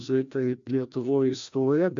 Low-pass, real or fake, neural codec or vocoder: 7.2 kHz; fake; codec, 16 kHz, 1 kbps, FreqCodec, larger model